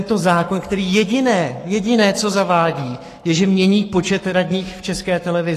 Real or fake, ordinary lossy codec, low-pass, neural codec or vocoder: fake; AAC, 48 kbps; 14.4 kHz; codec, 44.1 kHz, 7.8 kbps, Pupu-Codec